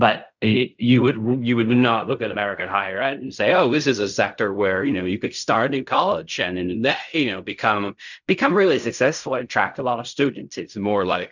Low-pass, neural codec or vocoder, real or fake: 7.2 kHz; codec, 16 kHz in and 24 kHz out, 0.4 kbps, LongCat-Audio-Codec, fine tuned four codebook decoder; fake